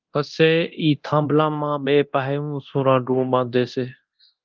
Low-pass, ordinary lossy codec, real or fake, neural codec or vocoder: 7.2 kHz; Opus, 32 kbps; fake; codec, 24 kHz, 0.9 kbps, DualCodec